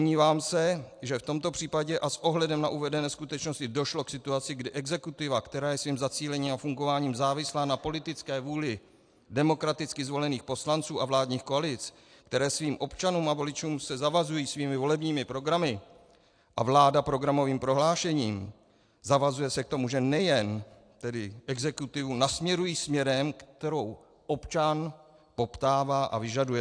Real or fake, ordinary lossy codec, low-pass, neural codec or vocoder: real; AAC, 64 kbps; 9.9 kHz; none